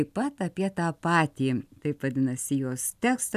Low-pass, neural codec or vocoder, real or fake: 14.4 kHz; none; real